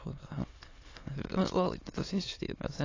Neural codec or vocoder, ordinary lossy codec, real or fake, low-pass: autoencoder, 22.05 kHz, a latent of 192 numbers a frame, VITS, trained on many speakers; AAC, 32 kbps; fake; 7.2 kHz